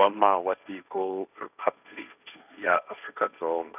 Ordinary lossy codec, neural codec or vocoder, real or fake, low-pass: none; codec, 16 kHz, 1.1 kbps, Voila-Tokenizer; fake; 3.6 kHz